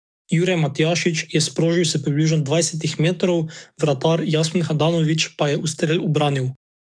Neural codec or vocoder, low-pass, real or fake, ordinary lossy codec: codec, 44.1 kHz, 7.8 kbps, DAC; 9.9 kHz; fake; none